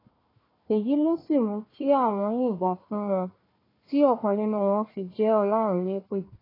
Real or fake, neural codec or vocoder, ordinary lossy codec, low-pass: fake; codec, 16 kHz, 1 kbps, FunCodec, trained on Chinese and English, 50 frames a second; AAC, 24 kbps; 5.4 kHz